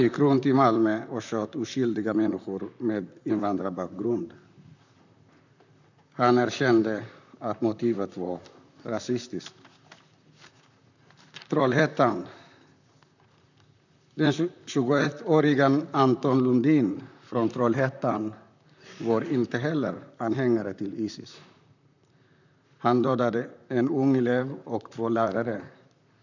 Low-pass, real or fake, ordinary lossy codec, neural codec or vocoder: 7.2 kHz; fake; none; vocoder, 44.1 kHz, 128 mel bands, Pupu-Vocoder